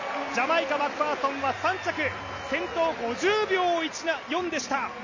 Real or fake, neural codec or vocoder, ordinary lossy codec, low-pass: real; none; MP3, 32 kbps; 7.2 kHz